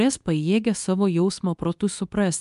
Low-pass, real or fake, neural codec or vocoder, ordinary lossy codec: 10.8 kHz; fake; codec, 24 kHz, 0.9 kbps, WavTokenizer, medium speech release version 2; MP3, 96 kbps